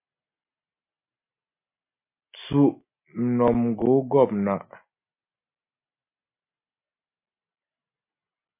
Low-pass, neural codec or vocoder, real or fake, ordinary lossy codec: 3.6 kHz; none; real; MP3, 24 kbps